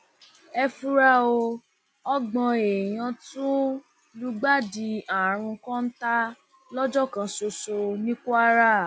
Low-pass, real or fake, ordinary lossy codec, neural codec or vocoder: none; real; none; none